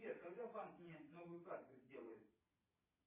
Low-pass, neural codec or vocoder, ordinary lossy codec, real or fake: 3.6 kHz; vocoder, 22.05 kHz, 80 mel bands, Vocos; MP3, 16 kbps; fake